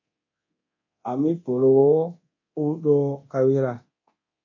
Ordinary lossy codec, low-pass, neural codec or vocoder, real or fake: MP3, 32 kbps; 7.2 kHz; codec, 24 kHz, 0.9 kbps, DualCodec; fake